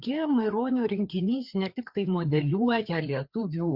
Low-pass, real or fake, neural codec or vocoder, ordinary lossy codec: 5.4 kHz; fake; codec, 16 kHz, 4 kbps, FreqCodec, larger model; Opus, 64 kbps